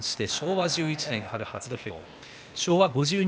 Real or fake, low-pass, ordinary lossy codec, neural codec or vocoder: fake; none; none; codec, 16 kHz, 0.8 kbps, ZipCodec